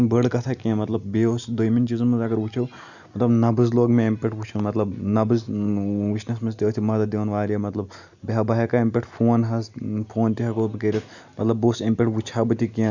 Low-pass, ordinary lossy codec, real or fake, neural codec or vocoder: 7.2 kHz; none; real; none